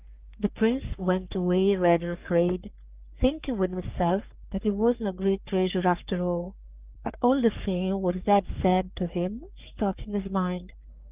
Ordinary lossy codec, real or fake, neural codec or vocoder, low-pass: Opus, 32 kbps; fake; codec, 44.1 kHz, 3.4 kbps, Pupu-Codec; 3.6 kHz